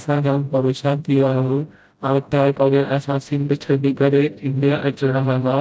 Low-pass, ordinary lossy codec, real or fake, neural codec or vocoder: none; none; fake; codec, 16 kHz, 0.5 kbps, FreqCodec, smaller model